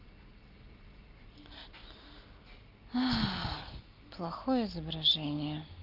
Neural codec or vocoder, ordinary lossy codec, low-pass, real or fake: none; Opus, 32 kbps; 5.4 kHz; real